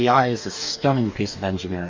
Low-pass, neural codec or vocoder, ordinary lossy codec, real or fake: 7.2 kHz; codec, 44.1 kHz, 2.6 kbps, DAC; MP3, 64 kbps; fake